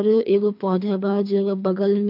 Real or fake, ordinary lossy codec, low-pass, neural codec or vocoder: fake; MP3, 48 kbps; 5.4 kHz; codec, 24 kHz, 3 kbps, HILCodec